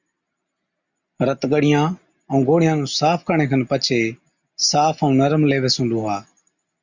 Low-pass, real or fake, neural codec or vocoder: 7.2 kHz; real; none